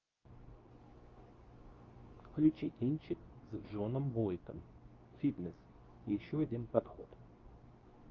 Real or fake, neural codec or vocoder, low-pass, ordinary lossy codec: fake; codec, 24 kHz, 0.9 kbps, WavTokenizer, medium speech release version 2; 7.2 kHz; Opus, 32 kbps